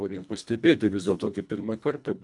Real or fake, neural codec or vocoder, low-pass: fake; codec, 24 kHz, 1.5 kbps, HILCodec; 10.8 kHz